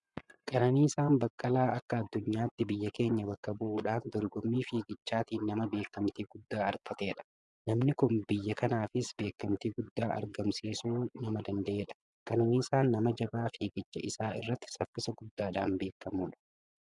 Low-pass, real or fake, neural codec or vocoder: 10.8 kHz; fake; vocoder, 24 kHz, 100 mel bands, Vocos